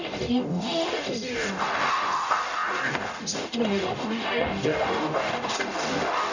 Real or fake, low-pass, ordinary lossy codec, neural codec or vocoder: fake; 7.2 kHz; none; codec, 44.1 kHz, 0.9 kbps, DAC